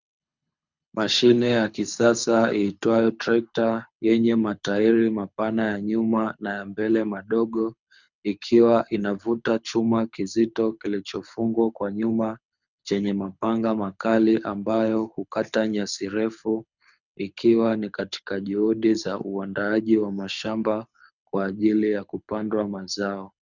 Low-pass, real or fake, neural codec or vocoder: 7.2 kHz; fake; codec, 24 kHz, 6 kbps, HILCodec